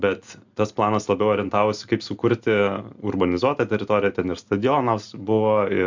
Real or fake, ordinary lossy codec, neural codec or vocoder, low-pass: real; MP3, 64 kbps; none; 7.2 kHz